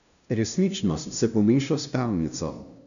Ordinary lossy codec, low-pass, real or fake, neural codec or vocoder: none; 7.2 kHz; fake; codec, 16 kHz, 0.5 kbps, FunCodec, trained on LibriTTS, 25 frames a second